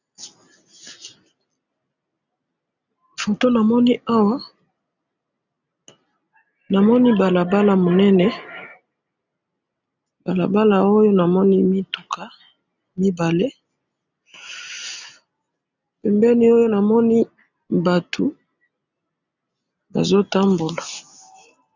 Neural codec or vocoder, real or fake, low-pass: none; real; 7.2 kHz